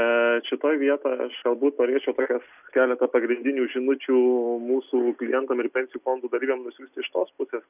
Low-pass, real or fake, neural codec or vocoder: 3.6 kHz; real; none